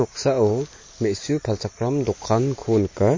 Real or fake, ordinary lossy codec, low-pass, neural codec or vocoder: real; MP3, 32 kbps; 7.2 kHz; none